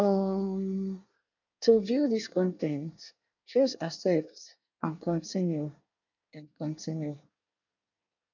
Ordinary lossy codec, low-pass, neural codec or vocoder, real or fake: none; 7.2 kHz; codec, 24 kHz, 1 kbps, SNAC; fake